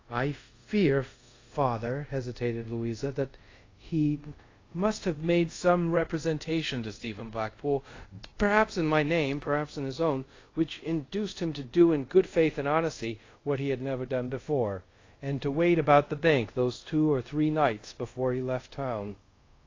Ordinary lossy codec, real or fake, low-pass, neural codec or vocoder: AAC, 32 kbps; fake; 7.2 kHz; codec, 24 kHz, 0.5 kbps, DualCodec